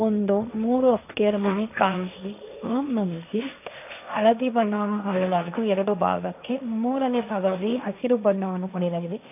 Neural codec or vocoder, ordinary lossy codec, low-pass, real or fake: codec, 16 kHz, 1.1 kbps, Voila-Tokenizer; none; 3.6 kHz; fake